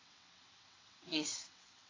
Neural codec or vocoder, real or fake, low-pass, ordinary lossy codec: vocoder, 22.05 kHz, 80 mel bands, WaveNeXt; fake; 7.2 kHz; AAC, 32 kbps